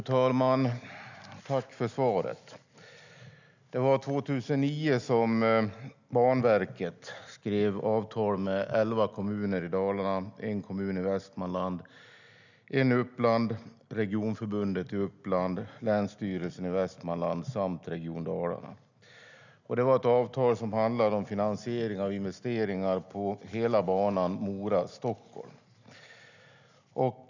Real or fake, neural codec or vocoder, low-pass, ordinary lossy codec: real; none; 7.2 kHz; none